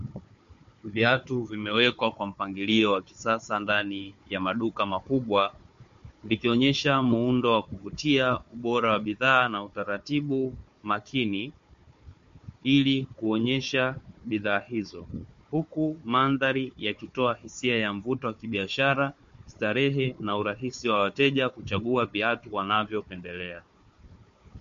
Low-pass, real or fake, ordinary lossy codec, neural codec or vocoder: 7.2 kHz; fake; MP3, 48 kbps; codec, 16 kHz, 4 kbps, FunCodec, trained on Chinese and English, 50 frames a second